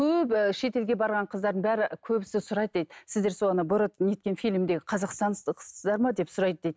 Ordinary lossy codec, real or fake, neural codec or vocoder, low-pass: none; real; none; none